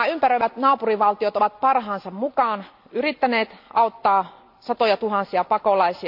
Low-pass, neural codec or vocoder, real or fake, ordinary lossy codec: 5.4 kHz; none; real; none